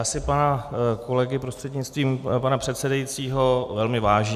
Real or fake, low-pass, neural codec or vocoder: real; 14.4 kHz; none